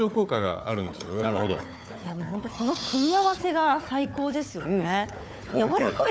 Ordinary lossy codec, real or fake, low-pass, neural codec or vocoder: none; fake; none; codec, 16 kHz, 4 kbps, FunCodec, trained on Chinese and English, 50 frames a second